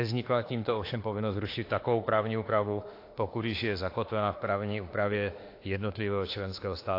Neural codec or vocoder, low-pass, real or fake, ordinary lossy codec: autoencoder, 48 kHz, 32 numbers a frame, DAC-VAE, trained on Japanese speech; 5.4 kHz; fake; AAC, 32 kbps